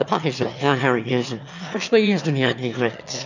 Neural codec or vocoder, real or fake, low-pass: autoencoder, 22.05 kHz, a latent of 192 numbers a frame, VITS, trained on one speaker; fake; 7.2 kHz